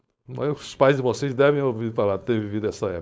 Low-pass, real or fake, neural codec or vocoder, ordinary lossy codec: none; fake; codec, 16 kHz, 4.8 kbps, FACodec; none